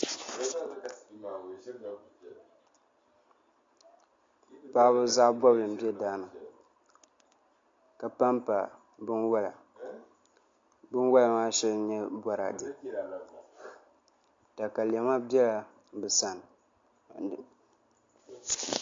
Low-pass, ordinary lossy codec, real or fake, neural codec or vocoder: 7.2 kHz; AAC, 48 kbps; real; none